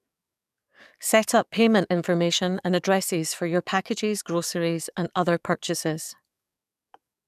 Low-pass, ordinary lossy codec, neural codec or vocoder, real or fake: 14.4 kHz; none; codec, 44.1 kHz, 7.8 kbps, DAC; fake